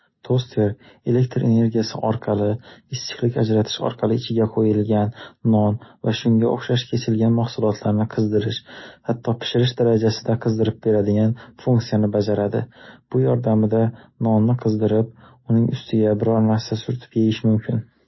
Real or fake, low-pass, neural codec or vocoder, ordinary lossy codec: real; 7.2 kHz; none; MP3, 24 kbps